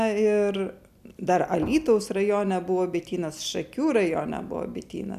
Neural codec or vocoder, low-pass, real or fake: none; 14.4 kHz; real